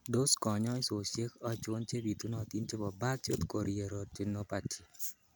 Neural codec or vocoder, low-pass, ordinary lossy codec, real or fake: none; none; none; real